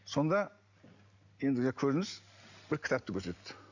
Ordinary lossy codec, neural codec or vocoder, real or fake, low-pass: none; none; real; 7.2 kHz